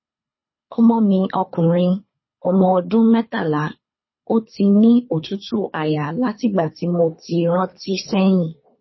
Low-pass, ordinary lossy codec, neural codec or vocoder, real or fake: 7.2 kHz; MP3, 24 kbps; codec, 24 kHz, 3 kbps, HILCodec; fake